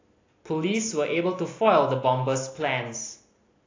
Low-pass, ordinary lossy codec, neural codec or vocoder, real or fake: 7.2 kHz; AAC, 32 kbps; none; real